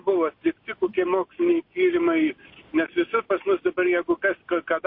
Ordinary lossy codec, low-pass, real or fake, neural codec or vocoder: MP3, 32 kbps; 5.4 kHz; real; none